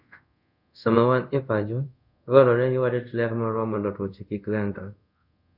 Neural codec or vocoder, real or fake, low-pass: codec, 24 kHz, 0.5 kbps, DualCodec; fake; 5.4 kHz